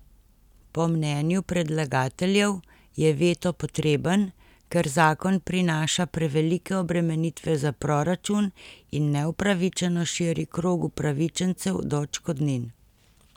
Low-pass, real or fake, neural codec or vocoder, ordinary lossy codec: 19.8 kHz; real; none; none